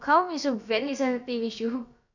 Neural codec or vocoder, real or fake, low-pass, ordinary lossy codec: codec, 16 kHz, about 1 kbps, DyCAST, with the encoder's durations; fake; 7.2 kHz; none